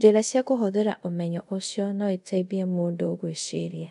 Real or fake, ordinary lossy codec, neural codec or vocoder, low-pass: fake; none; codec, 24 kHz, 0.5 kbps, DualCodec; 10.8 kHz